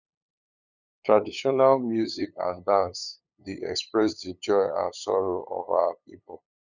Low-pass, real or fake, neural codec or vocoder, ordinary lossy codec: 7.2 kHz; fake; codec, 16 kHz, 8 kbps, FunCodec, trained on LibriTTS, 25 frames a second; none